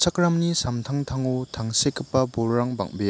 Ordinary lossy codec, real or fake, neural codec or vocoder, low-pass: none; real; none; none